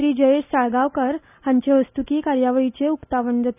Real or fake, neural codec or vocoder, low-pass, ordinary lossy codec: real; none; 3.6 kHz; none